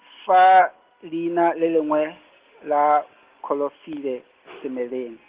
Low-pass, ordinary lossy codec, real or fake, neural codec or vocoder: 3.6 kHz; Opus, 16 kbps; real; none